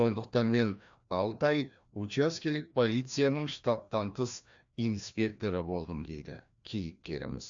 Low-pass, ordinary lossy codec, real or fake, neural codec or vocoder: 7.2 kHz; AAC, 64 kbps; fake; codec, 16 kHz, 1 kbps, FreqCodec, larger model